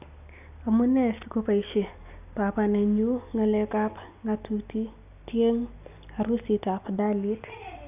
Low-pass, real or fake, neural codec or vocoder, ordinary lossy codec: 3.6 kHz; real; none; none